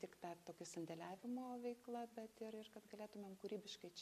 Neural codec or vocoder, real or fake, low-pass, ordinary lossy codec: none; real; 14.4 kHz; MP3, 64 kbps